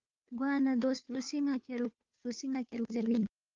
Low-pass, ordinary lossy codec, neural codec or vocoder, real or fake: 7.2 kHz; Opus, 32 kbps; codec, 16 kHz, 2 kbps, FunCodec, trained on Chinese and English, 25 frames a second; fake